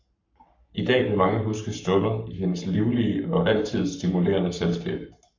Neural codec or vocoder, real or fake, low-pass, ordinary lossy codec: codec, 44.1 kHz, 7.8 kbps, Pupu-Codec; fake; 7.2 kHz; MP3, 64 kbps